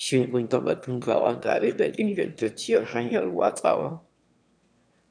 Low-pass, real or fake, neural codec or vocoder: 9.9 kHz; fake; autoencoder, 22.05 kHz, a latent of 192 numbers a frame, VITS, trained on one speaker